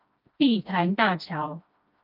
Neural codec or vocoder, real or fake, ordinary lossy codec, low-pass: codec, 16 kHz, 1 kbps, FreqCodec, smaller model; fake; Opus, 24 kbps; 5.4 kHz